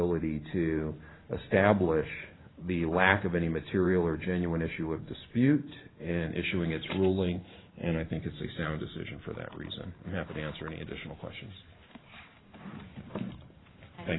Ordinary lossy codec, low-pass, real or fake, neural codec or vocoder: AAC, 16 kbps; 7.2 kHz; fake; vocoder, 44.1 kHz, 128 mel bands every 512 samples, BigVGAN v2